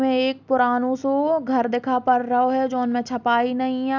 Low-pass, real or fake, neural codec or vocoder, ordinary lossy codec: 7.2 kHz; real; none; none